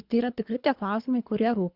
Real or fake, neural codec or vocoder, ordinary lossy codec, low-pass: fake; codec, 24 kHz, 3 kbps, HILCodec; Opus, 64 kbps; 5.4 kHz